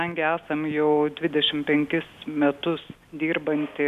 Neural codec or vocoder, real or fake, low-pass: none; real; 14.4 kHz